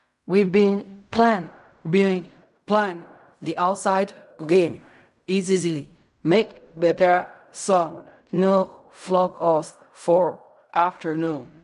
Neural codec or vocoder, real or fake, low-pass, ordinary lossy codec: codec, 16 kHz in and 24 kHz out, 0.4 kbps, LongCat-Audio-Codec, fine tuned four codebook decoder; fake; 10.8 kHz; none